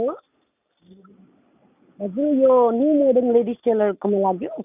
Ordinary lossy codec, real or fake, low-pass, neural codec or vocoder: none; real; 3.6 kHz; none